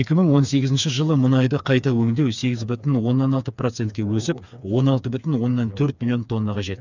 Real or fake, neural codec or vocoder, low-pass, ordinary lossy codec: fake; codec, 16 kHz, 4 kbps, FreqCodec, smaller model; 7.2 kHz; none